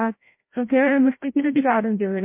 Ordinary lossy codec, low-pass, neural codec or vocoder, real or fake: MP3, 24 kbps; 3.6 kHz; codec, 16 kHz, 0.5 kbps, FreqCodec, larger model; fake